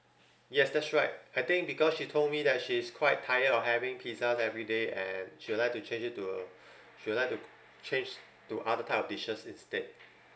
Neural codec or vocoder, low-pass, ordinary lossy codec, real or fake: none; none; none; real